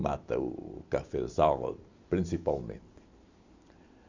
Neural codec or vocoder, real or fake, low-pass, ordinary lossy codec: none; real; 7.2 kHz; Opus, 64 kbps